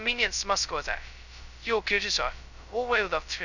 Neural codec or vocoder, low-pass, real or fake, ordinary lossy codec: codec, 16 kHz, 0.2 kbps, FocalCodec; 7.2 kHz; fake; none